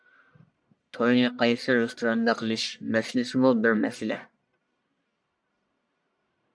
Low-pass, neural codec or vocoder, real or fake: 9.9 kHz; codec, 44.1 kHz, 1.7 kbps, Pupu-Codec; fake